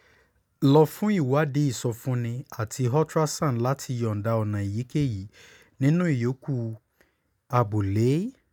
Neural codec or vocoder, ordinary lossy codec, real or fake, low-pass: none; none; real; 19.8 kHz